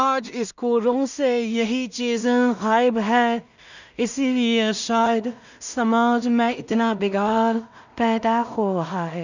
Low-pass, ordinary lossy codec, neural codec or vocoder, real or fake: 7.2 kHz; none; codec, 16 kHz in and 24 kHz out, 0.4 kbps, LongCat-Audio-Codec, two codebook decoder; fake